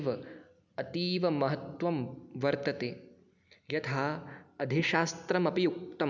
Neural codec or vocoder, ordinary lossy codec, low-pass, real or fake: none; none; 7.2 kHz; real